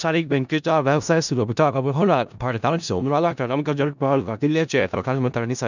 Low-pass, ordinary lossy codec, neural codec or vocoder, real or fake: 7.2 kHz; none; codec, 16 kHz in and 24 kHz out, 0.4 kbps, LongCat-Audio-Codec, four codebook decoder; fake